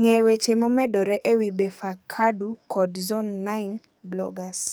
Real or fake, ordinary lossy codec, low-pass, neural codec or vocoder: fake; none; none; codec, 44.1 kHz, 2.6 kbps, SNAC